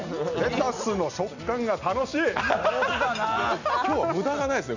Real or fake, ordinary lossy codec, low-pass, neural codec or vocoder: real; none; 7.2 kHz; none